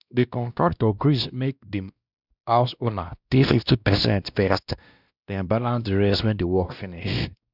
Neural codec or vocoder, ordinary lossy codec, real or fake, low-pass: codec, 16 kHz, 1 kbps, X-Codec, WavLM features, trained on Multilingual LibriSpeech; none; fake; 5.4 kHz